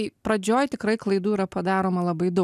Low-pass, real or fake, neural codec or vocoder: 14.4 kHz; real; none